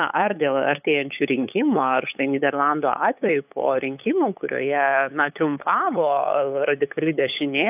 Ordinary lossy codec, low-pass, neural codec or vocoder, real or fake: AAC, 32 kbps; 3.6 kHz; codec, 16 kHz, 8 kbps, FunCodec, trained on LibriTTS, 25 frames a second; fake